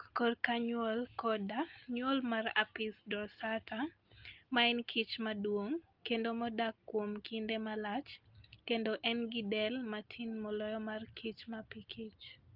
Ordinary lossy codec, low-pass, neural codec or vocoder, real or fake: Opus, 24 kbps; 5.4 kHz; none; real